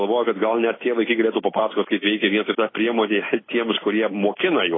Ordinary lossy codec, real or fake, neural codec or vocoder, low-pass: AAC, 16 kbps; real; none; 7.2 kHz